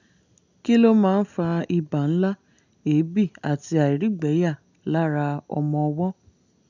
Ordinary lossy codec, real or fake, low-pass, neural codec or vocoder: none; real; 7.2 kHz; none